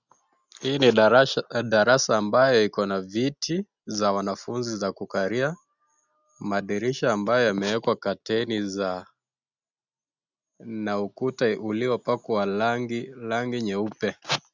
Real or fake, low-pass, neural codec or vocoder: real; 7.2 kHz; none